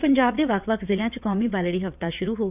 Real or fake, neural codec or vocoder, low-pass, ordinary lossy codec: fake; vocoder, 22.05 kHz, 80 mel bands, WaveNeXt; 3.6 kHz; none